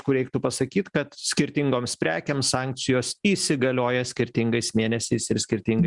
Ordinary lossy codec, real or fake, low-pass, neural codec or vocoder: Opus, 64 kbps; real; 10.8 kHz; none